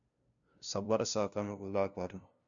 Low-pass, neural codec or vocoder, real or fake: 7.2 kHz; codec, 16 kHz, 0.5 kbps, FunCodec, trained on LibriTTS, 25 frames a second; fake